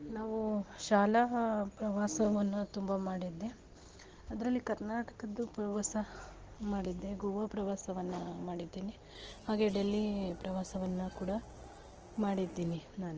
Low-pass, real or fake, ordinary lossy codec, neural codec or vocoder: 7.2 kHz; real; Opus, 16 kbps; none